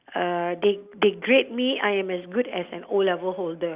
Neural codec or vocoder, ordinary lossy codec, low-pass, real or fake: none; Opus, 64 kbps; 3.6 kHz; real